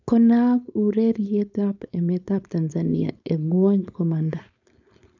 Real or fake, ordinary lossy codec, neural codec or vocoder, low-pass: fake; none; codec, 16 kHz, 4.8 kbps, FACodec; 7.2 kHz